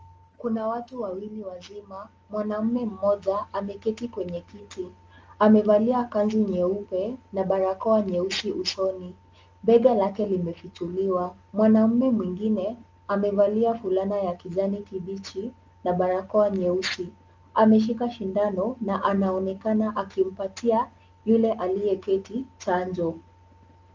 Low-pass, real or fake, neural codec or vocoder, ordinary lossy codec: 7.2 kHz; real; none; Opus, 24 kbps